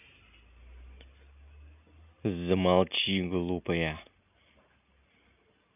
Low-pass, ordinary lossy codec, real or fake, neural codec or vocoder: 3.6 kHz; none; real; none